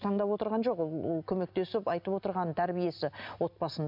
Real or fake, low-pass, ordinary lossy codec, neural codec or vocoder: real; 5.4 kHz; none; none